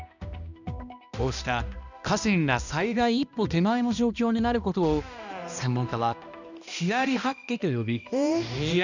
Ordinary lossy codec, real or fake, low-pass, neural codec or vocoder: none; fake; 7.2 kHz; codec, 16 kHz, 1 kbps, X-Codec, HuBERT features, trained on balanced general audio